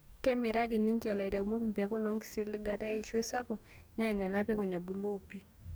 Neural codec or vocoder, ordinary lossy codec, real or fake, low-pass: codec, 44.1 kHz, 2.6 kbps, DAC; none; fake; none